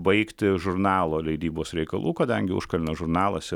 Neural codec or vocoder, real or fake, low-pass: none; real; 19.8 kHz